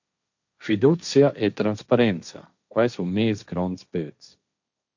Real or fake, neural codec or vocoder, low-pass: fake; codec, 16 kHz, 1.1 kbps, Voila-Tokenizer; 7.2 kHz